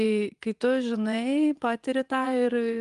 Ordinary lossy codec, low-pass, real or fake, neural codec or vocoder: Opus, 32 kbps; 10.8 kHz; fake; vocoder, 24 kHz, 100 mel bands, Vocos